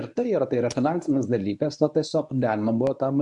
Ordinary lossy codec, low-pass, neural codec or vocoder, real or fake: MP3, 64 kbps; 10.8 kHz; codec, 24 kHz, 0.9 kbps, WavTokenizer, medium speech release version 1; fake